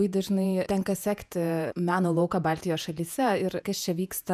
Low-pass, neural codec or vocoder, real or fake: 14.4 kHz; vocoder, 48 kHz, 128 mel bands, Vocos; fake